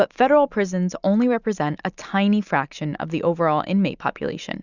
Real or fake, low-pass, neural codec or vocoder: real; 7.2 kHz; none